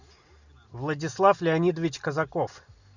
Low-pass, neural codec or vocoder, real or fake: 7.2 kHz; none; real